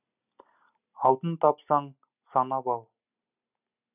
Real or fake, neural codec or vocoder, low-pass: real; none; 3.6 kHz